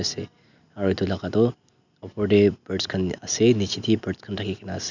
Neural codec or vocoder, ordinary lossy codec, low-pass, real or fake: none; none; 7.2 kHz; real